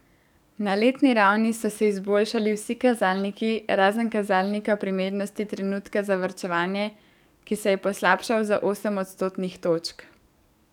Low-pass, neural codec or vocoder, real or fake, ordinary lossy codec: 19.8 kHz; codec, 44.1 kHz, 7.8 kbps, DAC; fake; none